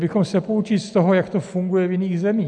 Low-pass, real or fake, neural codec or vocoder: 10.8 kHz; real; none